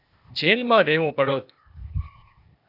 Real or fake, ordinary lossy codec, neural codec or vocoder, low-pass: fake; AAC, 48 kbps; codec, 16 kHz, 0.8 kbps, ZipCodec; 5.4 kHz